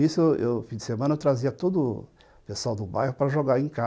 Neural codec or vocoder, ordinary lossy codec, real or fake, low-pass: none; none; real; none